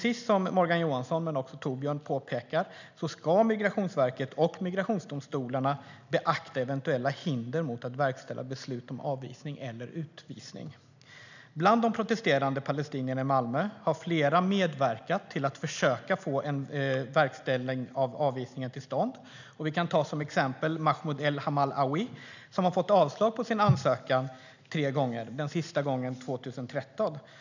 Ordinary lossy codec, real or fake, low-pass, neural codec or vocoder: none; real; 7.2 kHz; none